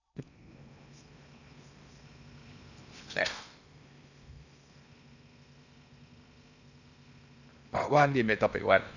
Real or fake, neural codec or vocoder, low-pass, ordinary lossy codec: fake; codec, 16 kHz in and 24 kHz out, 0.8 kbps, FocalCodec, streaming, 65536 codes; 7.2 kHz; none